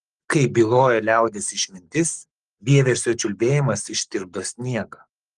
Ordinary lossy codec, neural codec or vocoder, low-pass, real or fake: Opus, 24 kbps; codec, 44.1 kHz, 7.8 kbps, Pupu-Codec; 10.8 kHz; fake